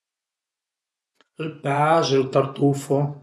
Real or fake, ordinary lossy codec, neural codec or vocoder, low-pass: fake; none; vocoder, 24 kHz, 100 mel bands, Vocos; none